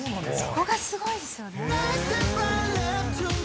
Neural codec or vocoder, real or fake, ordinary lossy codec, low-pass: none; real; none; none